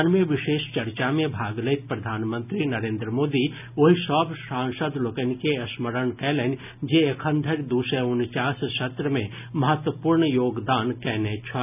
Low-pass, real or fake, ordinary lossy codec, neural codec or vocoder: 3.6 kHz; real; none; none